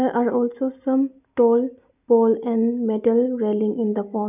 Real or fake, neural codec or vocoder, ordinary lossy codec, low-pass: real; none; none; 3.6 kHz